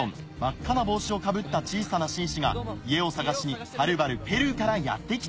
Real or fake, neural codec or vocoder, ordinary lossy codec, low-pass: real; none; none; none